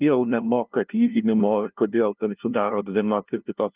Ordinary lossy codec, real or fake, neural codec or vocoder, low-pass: Opus, 32 kbps; fake; codec, 16 kHz, 0.5 kbps, FunCodec, trained on LibriTTS, 25 frames a second; 3.6 kHz